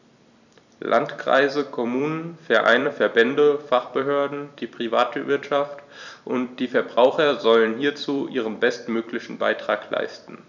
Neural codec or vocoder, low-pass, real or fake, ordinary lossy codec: vocoder, 44.1 kHz, 128 mel bands every 256 samples, BigVGAN v2; 7.2 kHz; fake; none